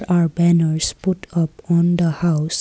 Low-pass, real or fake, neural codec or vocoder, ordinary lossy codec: none; real; none; none